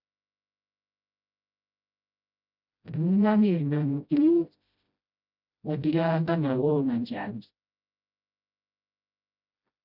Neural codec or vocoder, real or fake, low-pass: codec, 16 kHz, 0.5 kbps, FreqCodec, smaller model; fake; 5.4 kHz